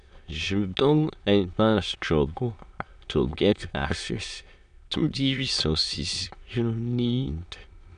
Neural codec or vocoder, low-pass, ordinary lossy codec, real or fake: autoencoder, 22.05 kHz, a latent of 192 numbers a frame, VITS, trained on many speakers; 9.9 kHz; none; fake